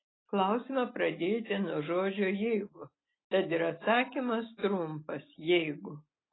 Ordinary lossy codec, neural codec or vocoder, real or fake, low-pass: AAC, 16 kbps; none; real; 7.2 kHz